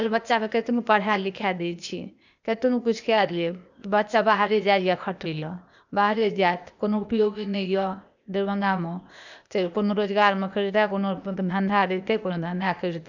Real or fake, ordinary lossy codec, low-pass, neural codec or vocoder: fake; none; 7.2 kHz; codec, 16 kHz, 0.8 kbps, ZipCodec